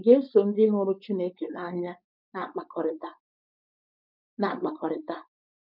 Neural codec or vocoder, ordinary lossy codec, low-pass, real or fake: codec, 16 kHz, 4.8 kbps, FACodec; none; 5.4 kHz; fake